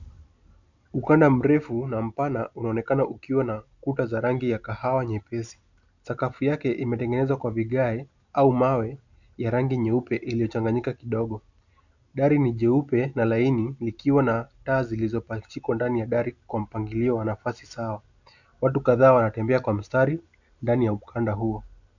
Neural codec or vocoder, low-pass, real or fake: none; 7.2 kHz; real